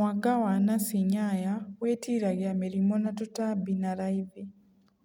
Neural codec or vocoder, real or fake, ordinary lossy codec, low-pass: none; real; none; 19.8 kHz